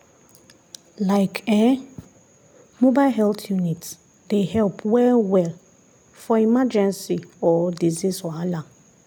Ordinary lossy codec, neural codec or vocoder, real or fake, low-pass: none; none; real; none